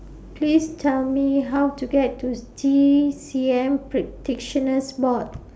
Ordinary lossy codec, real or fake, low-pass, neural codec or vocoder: none; real; none; none